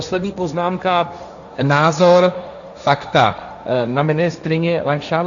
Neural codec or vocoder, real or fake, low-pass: codec, 16 kHz, 1.1 kbps, Voila-Tokenizer; fake; 7.2 kHz